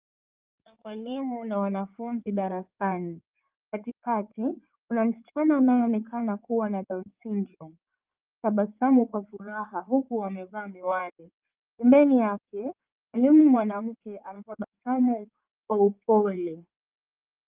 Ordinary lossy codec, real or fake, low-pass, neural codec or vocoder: Opus, 24 kbps; fake; 3.6 kHz; codec, 16 kHz in and 24 kHz out, 2.2 kbps, FireRedTTS-2 codec